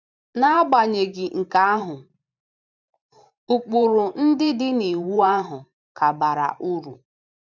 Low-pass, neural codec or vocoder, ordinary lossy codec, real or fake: 7.2 kHz; vocoder, 44.1 kHz, 128 mel bands every 512 samples, BigVGAN v2; none; fake